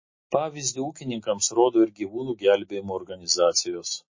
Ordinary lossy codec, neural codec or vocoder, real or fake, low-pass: MP3, 32 kbps; none; real; 7.2 kHz